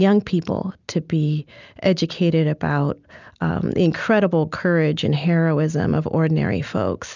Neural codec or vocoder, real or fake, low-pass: none; real; 7.2 kHz